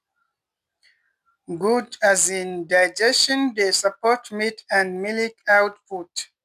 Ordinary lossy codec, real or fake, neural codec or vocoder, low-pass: none; real; none; 14.4 kHz